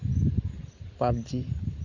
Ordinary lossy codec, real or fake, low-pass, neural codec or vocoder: none; real; 7.2 kHz; none